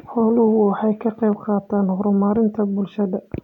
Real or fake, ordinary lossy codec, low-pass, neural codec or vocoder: real; none; 19.8 kHz; none